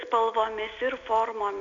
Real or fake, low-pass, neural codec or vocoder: real; 7.2 kHz; none